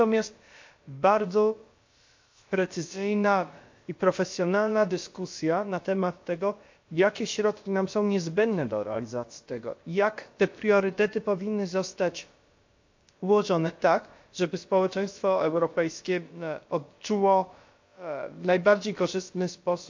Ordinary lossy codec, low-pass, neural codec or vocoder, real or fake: MP3, 48 kbps; 7.2 kHz; codec, 16 kHz, about 1 kbps, DyCAST, with the encoder's durations; fake